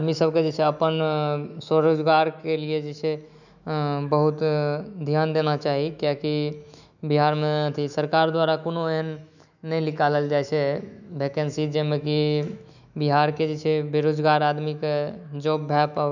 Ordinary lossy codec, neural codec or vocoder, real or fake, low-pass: none; autoencoder, 48 kHz, 128 numbers a frame, DAC-VAE, trained on Japanese speech; fake; 7.2 kHz